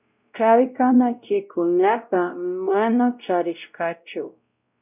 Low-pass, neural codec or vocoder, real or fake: 3.6 kHz; codec, 16 kHz, 0.5 kbps, X-Codec, WavLM features, trained on Multilingual LibriSpeech; fake